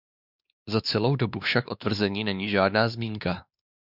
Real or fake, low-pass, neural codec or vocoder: fake; 5.4 kHz; codec, 16 kHz, 2 kbps, X-Codec, WavLM features, trained on Multilingual LibriSpeech